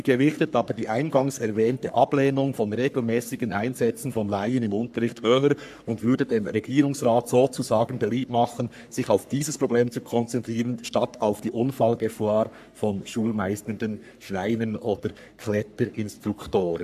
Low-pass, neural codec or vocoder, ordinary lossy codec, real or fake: 14.4 kHz; codec, 44.1 kHz, 3.4 kbps, Pupu-Codec; AAC, 96 kbps; fake